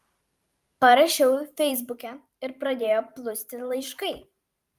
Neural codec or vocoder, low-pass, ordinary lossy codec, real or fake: vocoder, 44.1 kHz, 128 mel bands every 512 samples, BigVGAN v2; 14.4 kHz; Opus, 32 kbps; fake